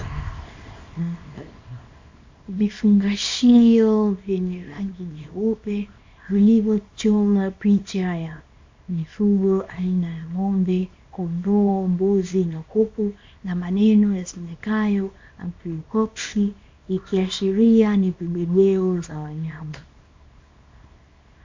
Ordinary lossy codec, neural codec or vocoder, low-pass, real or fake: MP3, 64 kbps; codec, 24 kHz, 0.9 kbps, WavTokenizer, small release; 7.2 kHz; fake